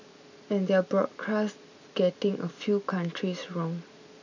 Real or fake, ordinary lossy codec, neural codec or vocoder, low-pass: real; none; none; 7.2 kHz